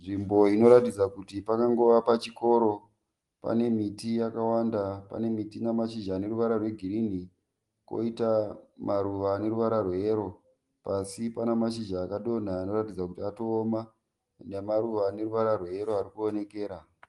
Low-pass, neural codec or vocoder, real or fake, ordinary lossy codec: 10.8 kHz; none; real; Opus, 24 kbps